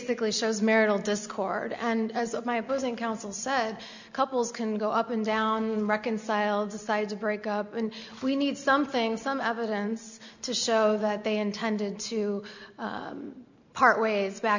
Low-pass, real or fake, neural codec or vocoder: 7.2 kHz; real; none